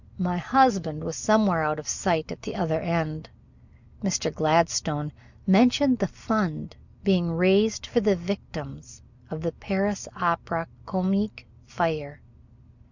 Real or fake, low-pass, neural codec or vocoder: real; 7.2 kHz; none